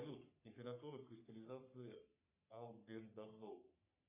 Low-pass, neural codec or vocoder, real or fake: 3.6 kHz; codec, 44.1 kHz, 3.4 kbps, Pupu-Codec; fake